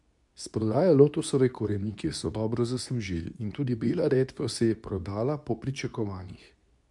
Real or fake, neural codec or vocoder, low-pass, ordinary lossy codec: fake; codec, 24 kHz, 0.9 kbps, WavTokenizer, medium speech release version 2; 10.8 kHz; MP3, 96 kbps